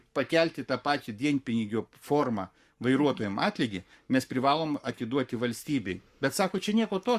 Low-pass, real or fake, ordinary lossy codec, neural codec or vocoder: 14.4 kHz; fake; Opus, 64 kbps; codec, 44.1 kHz, 7.8 kbps, Pupu-Codec